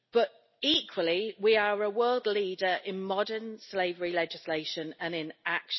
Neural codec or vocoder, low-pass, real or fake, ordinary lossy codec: none; 7.2 kHz; real; MP3, 24 kbps